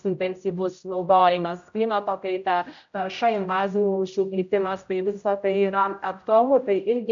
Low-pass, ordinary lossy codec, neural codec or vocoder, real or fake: 7.2 kHz; AAC, 64 kbps; codec, 16 kHz, 0.5 kbps, X-Codec, HuBERT features, trained on general audio; fake